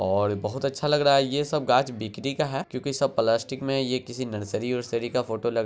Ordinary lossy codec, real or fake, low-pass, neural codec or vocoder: none; real; none; none